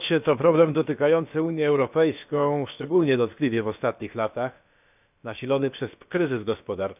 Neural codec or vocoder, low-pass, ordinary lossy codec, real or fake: codec, 16 kHz, about 1 kbps, DyCAST, with the encoder's durations; 3.6 kHz; none; fake